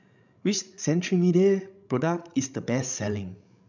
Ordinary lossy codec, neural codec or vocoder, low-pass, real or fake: none; codec, 16 kHz, 16 kbps, FreqCodec, larger model; 7.2 kHz; fake